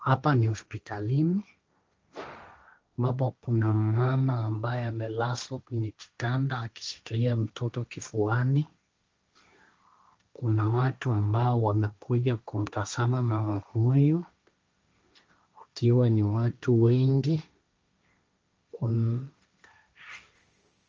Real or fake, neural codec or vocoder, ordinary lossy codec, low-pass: fake; codec, 16 kHz, 1.1 kbps, Voila-Tokenizer; Opus, 24 kbps; 7.2 kHz